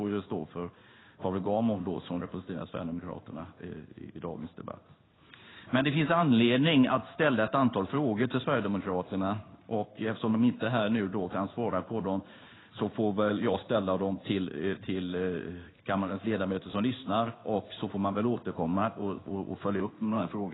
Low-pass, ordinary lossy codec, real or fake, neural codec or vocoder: 7.2 kHz; AAC, 16 kbps; fake; codec, 16 kHz in and 24 kHz out, 1 kbps, XY-Tokenizer